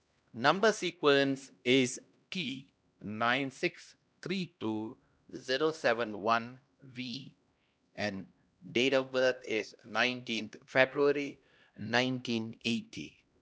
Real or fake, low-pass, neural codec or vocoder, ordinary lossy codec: fake; none; codec, 16 kHz, 1 kbps, X-Codec, HuBERT features, trained on LibriSpeech; none